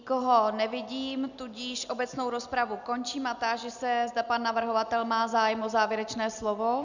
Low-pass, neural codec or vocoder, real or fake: 7.2 kHz; none; real